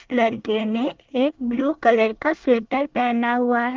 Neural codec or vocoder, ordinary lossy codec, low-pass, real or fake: codec, 24 kHz, 1 kbps, SNAC; Opus, 24 kbps; 7.2 kHz; fake